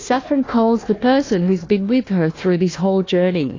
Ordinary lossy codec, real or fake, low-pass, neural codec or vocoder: AAC, 32 kbps; fake; 7.2 kHz; codec, 16 kHz, 1 kbps, FunCodec, trained on Chinese and English, 50 frames a second